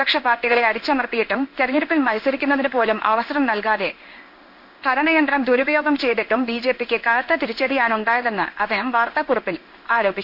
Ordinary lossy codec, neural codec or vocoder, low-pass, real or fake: none; codec, 16 kHz in and 24 kHz out, 1 kbps, XY-Tokenizer; 5.4 kHz; fake